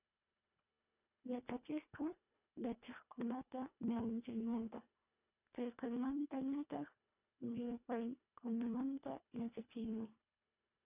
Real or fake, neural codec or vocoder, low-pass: fake; codec, 24 kHz, 1.5 kbps, HILCodec; 3.6 kHz